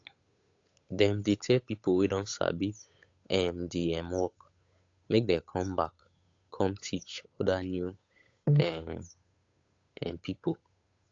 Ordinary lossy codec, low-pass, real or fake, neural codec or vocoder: AAC, 48 kbps; 7.2 kHz; fake; codec, 16 kHz, 8 kbps, FunCodec, trained on Chinese and English, 25 frames a second